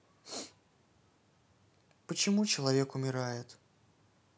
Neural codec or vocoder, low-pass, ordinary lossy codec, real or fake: none; none; none; real